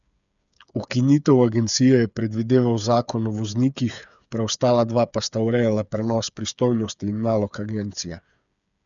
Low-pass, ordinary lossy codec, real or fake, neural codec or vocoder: 7.2 kHz; none; fake; codec, 16 kHz, 8 kbps, FreqCodec, smaller model